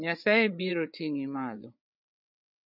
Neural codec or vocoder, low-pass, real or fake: codec, 16 kHz, 8 kbps, FreqCodec, larger model; 5.4 kHz; fake